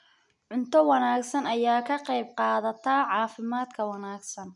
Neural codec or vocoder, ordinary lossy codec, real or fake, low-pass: none; none; real; 10.8 kHz